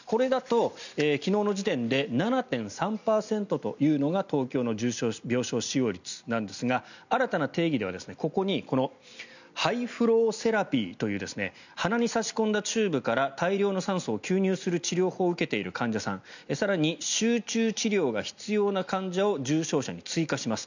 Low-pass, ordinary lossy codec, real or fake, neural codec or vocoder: 7.2 kHz; none; real; none